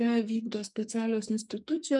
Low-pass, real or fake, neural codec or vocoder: 10.8 kHz; fake; codec, 44.1 kHz, 2.6 kbps, SNAC